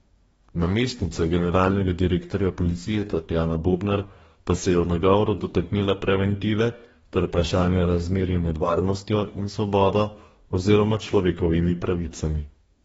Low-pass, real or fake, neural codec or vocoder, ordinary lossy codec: 19.8 kHz; fake; codec, 44.1 kHz, 2.6 kbps, DAC; AAC, 24 kbps